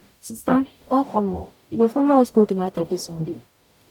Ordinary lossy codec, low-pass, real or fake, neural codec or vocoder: none; 19.8 kHz; fake; codec, 44.1 kHz, 0.9 kbps, DAC